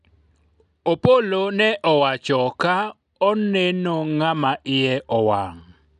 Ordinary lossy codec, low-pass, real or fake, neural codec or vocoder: none; 10.8 kHz; real; none